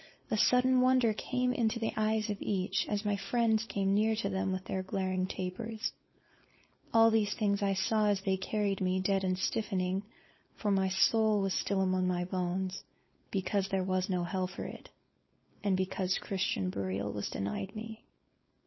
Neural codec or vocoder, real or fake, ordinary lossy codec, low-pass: codec, 16 kHz, 4.8 kbps, FACodec; fake; MP3, 24 kbps; 7.2 kHz